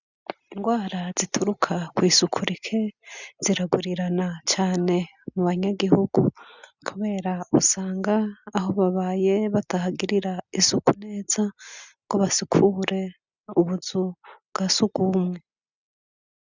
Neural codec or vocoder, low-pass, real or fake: none; 7.2 kHz; real